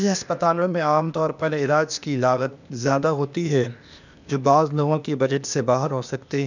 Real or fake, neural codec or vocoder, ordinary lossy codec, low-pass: fake; codec, 16 kHz, 0.8 kbps, ZipCodec; none; 7.2 kHz